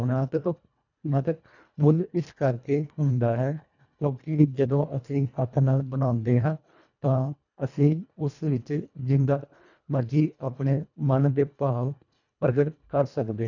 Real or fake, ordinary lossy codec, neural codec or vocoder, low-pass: fake; none; codec, 24 kHz, 1.5 kbps, HILCodec; 7.2 kHz